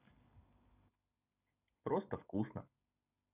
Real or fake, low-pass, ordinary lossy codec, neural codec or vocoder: real; 3.6 kHz; none; none